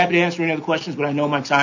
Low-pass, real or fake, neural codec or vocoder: 7.2 kHz; real; none